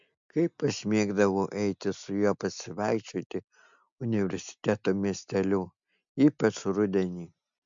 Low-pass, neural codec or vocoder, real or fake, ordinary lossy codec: 7.2 kHz; none; real; MP3, 64 kbps